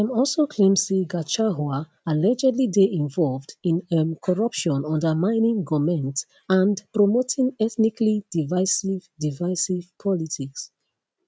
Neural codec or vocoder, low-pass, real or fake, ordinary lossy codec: none; none; real; none